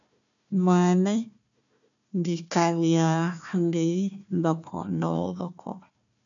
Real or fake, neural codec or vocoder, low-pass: fake; codec, 16 kHz, 1 kbps, FunCodec, trained on Chinese and English, 50 frames a second; 7.2 kHz